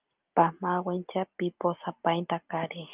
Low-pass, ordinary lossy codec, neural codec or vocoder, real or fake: 3.6 kHz; Opus, 24 kbps; none; real